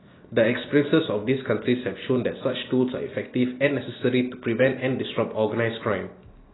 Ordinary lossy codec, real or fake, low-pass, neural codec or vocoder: AAC, 16 kbps; real; 7.2 kHz; none